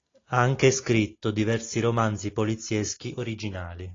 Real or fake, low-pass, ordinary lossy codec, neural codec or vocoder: real; 7.2 kHz; AAC, 32 kbps; none